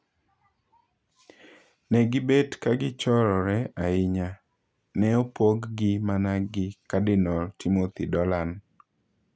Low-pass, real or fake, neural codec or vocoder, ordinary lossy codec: none; real; none; none